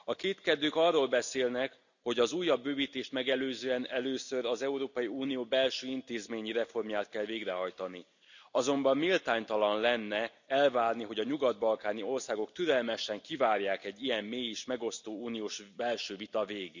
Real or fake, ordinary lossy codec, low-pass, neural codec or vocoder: real; none; 7.2 kHz; none